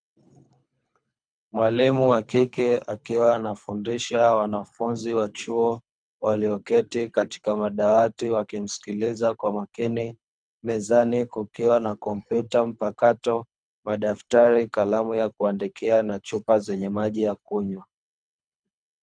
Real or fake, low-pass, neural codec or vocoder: fake; 9.9 kHz; codec, 24 kHz, 3 kbps, HILCodec